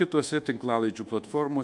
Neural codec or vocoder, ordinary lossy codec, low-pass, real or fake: codec, 24 kHz, 1.2 kbps, DualCodec; MP3, 64 kbps; 10.8 kHz; fake